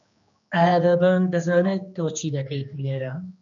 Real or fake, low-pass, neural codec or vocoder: fake; 7.2 kHz; codec, 16 kHz, 2 kbps, X-Codec, HuBERT features, trained on general audio